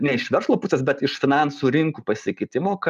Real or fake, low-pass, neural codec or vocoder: real; 14.4 kHz; none